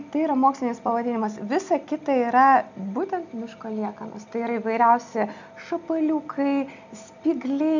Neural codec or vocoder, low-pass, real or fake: none; 7.2 kHz; real